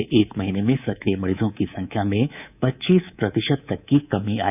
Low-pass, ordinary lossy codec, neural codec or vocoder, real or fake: 3.6 kHz; none; codec, 24 kHz, 3.1 kbps, DualCodec; fake